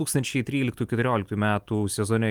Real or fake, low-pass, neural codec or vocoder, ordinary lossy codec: real; 19.8 kHz; none; Opus, 32 kbps